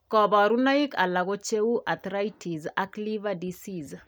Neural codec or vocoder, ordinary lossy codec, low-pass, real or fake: none; none; none; real